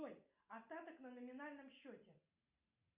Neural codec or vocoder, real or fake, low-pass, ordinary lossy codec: none; real; 3.6 kHz; Opus, 64 kbps